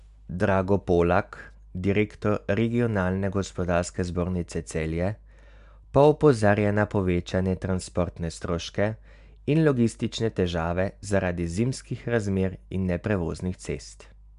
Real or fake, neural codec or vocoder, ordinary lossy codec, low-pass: real; none; AAC, 96 kbps; 10.8 kHz